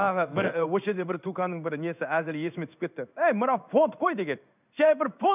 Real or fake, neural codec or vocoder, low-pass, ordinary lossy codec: fake; codec, 16 kHz in and 24 kHz out, 1 kbps, XY-Tokenizer; 3.6 kHz; none